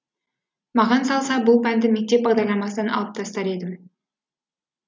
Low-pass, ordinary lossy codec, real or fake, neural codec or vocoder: none; none; real; none